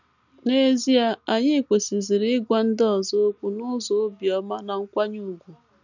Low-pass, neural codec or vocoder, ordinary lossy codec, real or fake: 7.2 kHz; none; none; real